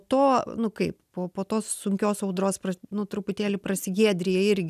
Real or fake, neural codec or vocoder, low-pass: real; none; 14.4 kHz